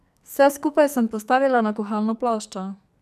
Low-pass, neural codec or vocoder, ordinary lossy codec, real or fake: 14.4 kHz; codec, 44.1 kHz, 2.6 kbps, SNAC; none; fake